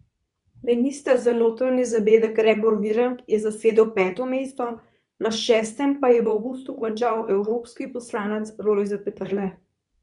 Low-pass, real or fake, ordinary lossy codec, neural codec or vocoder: 10.8 kHz; fake; none; codec, 24 kHz, 0.9 kbps, WavTokenizer, medium speech release version 2